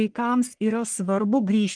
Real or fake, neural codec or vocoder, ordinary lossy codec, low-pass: fake; codec, 44.1 kHz, 1.7 kbps, Pupu-Codec; Opus, 24 kbps; 9.9 kHz